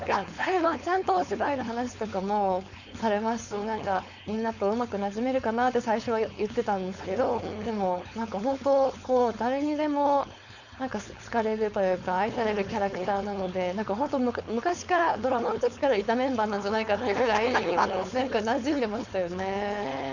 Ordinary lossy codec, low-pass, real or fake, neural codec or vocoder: none; 7.2 kHz; fake; codec, 16 kHz, 4.8 kbps, FACodec